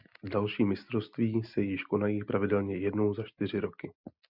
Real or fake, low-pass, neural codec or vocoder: real; 5.4 kHz; none